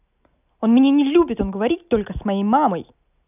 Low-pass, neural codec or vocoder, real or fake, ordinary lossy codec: 3.6 kHz; none; real; none